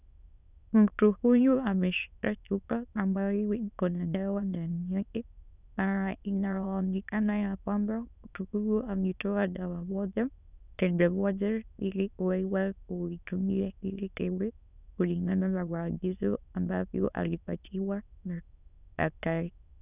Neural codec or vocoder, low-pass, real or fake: autoencoder, 22.05 kHz, a latent of 192 numbers a frame, VITS, trained on many speakers; 3.6 kHz; fake